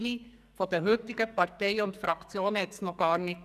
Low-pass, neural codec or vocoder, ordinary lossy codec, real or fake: 14.4 kHz; codec, 44.1 kHz, 2.6 kbps, SNAC; none; fake